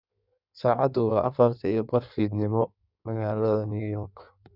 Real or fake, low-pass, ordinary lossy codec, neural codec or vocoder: fake; 5.4 kHz; none; codec, 16 kHz in and 24 kHz out, 1.1 kbps, FireRedTTS-2 codec